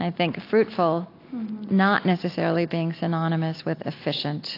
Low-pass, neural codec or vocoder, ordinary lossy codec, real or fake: 5.4 kHz; none; AAC, 32 kbps; real